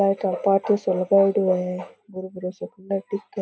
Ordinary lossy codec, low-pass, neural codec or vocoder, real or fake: none; none; none; real